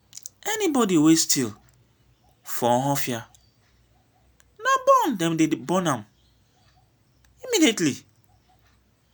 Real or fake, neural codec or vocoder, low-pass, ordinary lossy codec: real; none; none; none